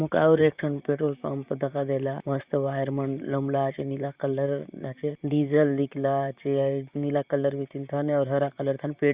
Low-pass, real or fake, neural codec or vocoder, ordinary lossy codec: 3.6 kHz; fake; vocoder, 44.1 kHz, 128 mel bands, Pupu-Vocoder; Opus, 64 kbps